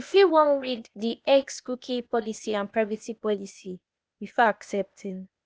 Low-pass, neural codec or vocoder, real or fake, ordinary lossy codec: none; codec, 16 kHz, 0.8 kbps, ZipCodec; fake; none